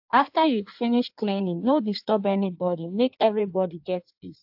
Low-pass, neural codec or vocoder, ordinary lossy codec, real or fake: 5.4 kHz; codec, 16 kHz in and 24 kHz out, 1.1 kbps, FireRedTTS-2 codec; AAC, 48 kbps; fake